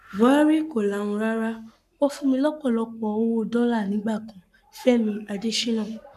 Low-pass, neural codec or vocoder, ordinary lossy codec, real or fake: 14.4 kHz; codec, 44.1 kHz, 7.8 kbps, Pupu-Codec; none; fake